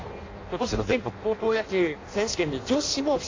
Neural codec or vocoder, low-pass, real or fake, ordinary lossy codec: codec, 16 kHz in and 24 kHz out, 0.6 kbps, FireRedTTS-2 codec; 7.2 kHz; fake; AAC, 32 kbps